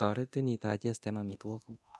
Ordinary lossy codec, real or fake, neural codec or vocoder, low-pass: none; fake; codec, 24 kHz, 0.9 kbps, DualCodec; none